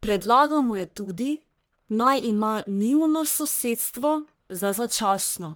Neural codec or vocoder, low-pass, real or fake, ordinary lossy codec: codec, 44.1 kHz, 1.7 kbps, Pupu-Codec; none; fake; none